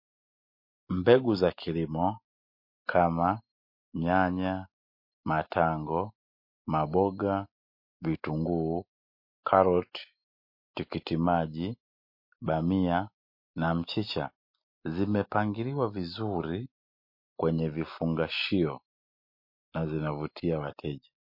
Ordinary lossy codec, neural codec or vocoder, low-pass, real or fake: MP3, 32 kbps; none; 5.4 kHz; real